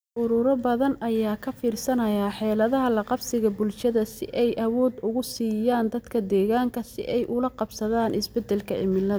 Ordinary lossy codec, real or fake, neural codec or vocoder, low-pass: none; real; none; none